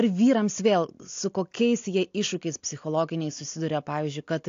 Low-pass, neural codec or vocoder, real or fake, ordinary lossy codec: 7.2 kHz; none; real; AAC, 48 kbps